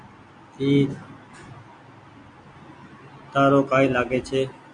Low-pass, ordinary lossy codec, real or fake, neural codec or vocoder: 9.9 kHz; MP3, 64 kbps; real; none